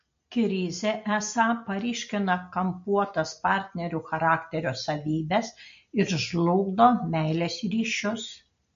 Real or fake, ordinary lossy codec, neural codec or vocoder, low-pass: real; MP3, 48 kbps; none; 7.2 kHz